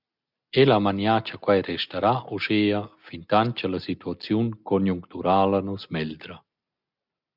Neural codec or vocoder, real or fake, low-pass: none; real; 5.4 kHz